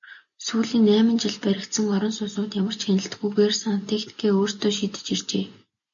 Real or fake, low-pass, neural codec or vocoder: real; 7.2 kHz; none